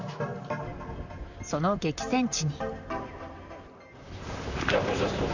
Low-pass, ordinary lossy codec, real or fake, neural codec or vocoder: 7.2 kHz; none; fake; vocoder, 44.1 kHz, 128 mel bands, Pupu-Vocoder